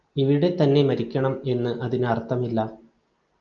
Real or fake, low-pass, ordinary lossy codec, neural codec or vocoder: real; 7.2 kHz; Opus, 24 kbps; none